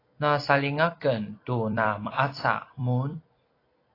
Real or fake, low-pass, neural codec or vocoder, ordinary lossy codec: fake; 5.4 kHz; vocoder, 44.1 kHz, 128 mel bands every 256 samples, BigVGAN v2; AAC, 24 kbps